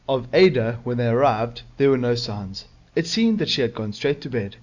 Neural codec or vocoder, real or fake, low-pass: none; real; 7.2 kHz